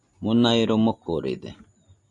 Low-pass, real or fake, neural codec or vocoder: 10.8 kHz; real; none